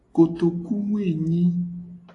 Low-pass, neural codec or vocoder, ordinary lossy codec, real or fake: 10.8 kHz; none; AAC, 48 kbps; real